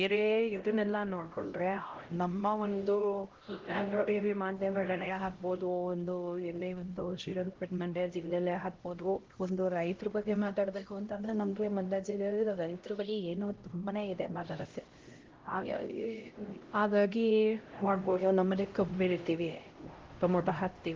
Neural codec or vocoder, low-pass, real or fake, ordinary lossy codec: codec, 16 kHz, 0.5 kbps, X-Codec, HuBERT features, trained on LibriSpeech; 7.2 kHz; fake; Opus, 16 kbps